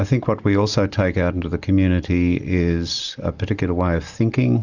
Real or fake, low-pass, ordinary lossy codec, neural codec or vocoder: real; 7.2 kHz; Opus, 64 kbps; none